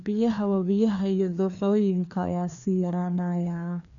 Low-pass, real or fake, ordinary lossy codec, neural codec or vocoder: 7.2 kHz; fake; none; codec, 16 kHz, 2 kbps, FreqCodec, larger model